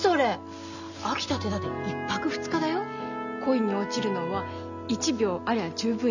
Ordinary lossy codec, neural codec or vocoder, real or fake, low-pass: none; none; real; 7.2 kHz